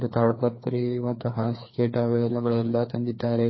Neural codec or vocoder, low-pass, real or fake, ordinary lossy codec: codec, 16 kHz, 2 kbps, FreqCodec, larger model; 7.2 kHz; fake; MP3, 24 kbps